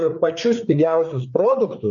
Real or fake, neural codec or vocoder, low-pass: fake; codec, 16 kHz, 4 kbps, FreqCodec, larger model; 7.2 kHz